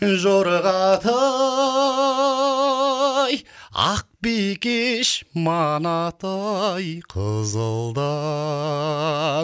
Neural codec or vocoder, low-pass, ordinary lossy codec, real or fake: none; none; none; real